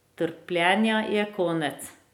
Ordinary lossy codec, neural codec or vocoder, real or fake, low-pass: none; none; real; 19.8 kHz